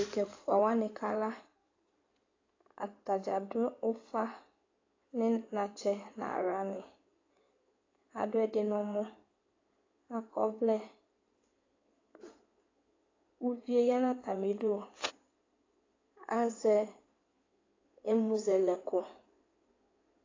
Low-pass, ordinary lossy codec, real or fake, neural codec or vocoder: 7.2 kHz; AAC, 32 kbps; fake; codec, 16 kHz in and 24 kHz out, 2.2 kbps, FireRedTTS-2 codec